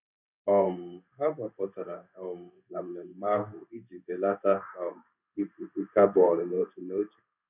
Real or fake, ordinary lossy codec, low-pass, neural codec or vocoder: fake; none; 3.6 kHz; codec, 16 kHz in and 24 kHz out, 1 kbps, XY-Tokenizer